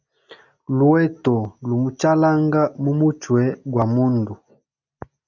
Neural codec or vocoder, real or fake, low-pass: none; real; 7.2 kHz